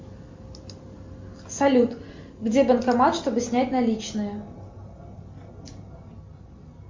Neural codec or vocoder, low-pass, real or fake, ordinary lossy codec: none; 7.2 kHz; real; MP3, 48 kbps